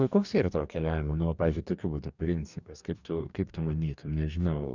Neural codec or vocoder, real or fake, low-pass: codec, 44.1 kHz, 2.6 kbps, DAC; fake; 7.2 kHz